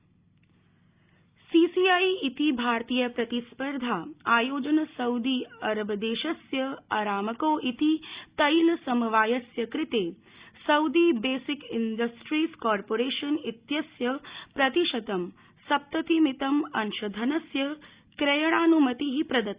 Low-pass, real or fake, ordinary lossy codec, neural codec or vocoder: 3.6 kHz; real; Opus, 64 kbps; none